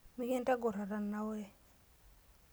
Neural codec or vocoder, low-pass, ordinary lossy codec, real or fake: none; none; none; real